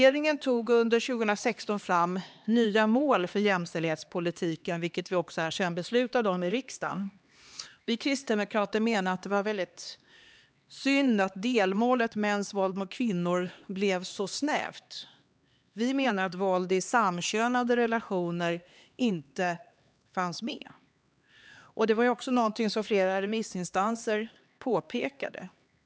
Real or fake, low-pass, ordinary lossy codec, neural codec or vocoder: fake; none; none; codec, 16 kHz, 2 kbps, X-Codec, HuBERT features, trained on LibriSpeech